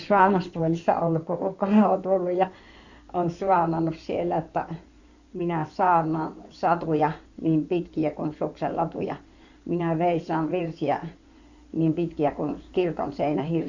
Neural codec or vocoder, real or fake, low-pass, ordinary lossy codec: codec, 16 kHz in and 24 kHz out, 2.2 kbps, FireRedTTS-2 codec; fake; 7.2 kHz; none